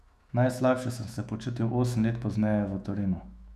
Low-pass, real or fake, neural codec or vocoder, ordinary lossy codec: 14.4 kHz; fake; autoencoder, 48 kHz, 128 numbers a frame, DAC-VAE, trained on Japanese speech; none